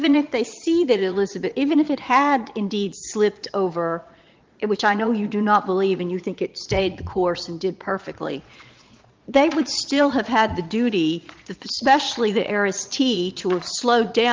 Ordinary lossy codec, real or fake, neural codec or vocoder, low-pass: Opus, 32 kbps; fake; vocoder, 22.05 kHz, 80 mel bands, Vocos; 7.2 kHz